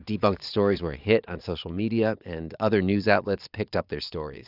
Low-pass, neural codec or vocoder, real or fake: 5.4 kHz; vocoder, 22.05 kHz, 80 mel bands, Vocos; fake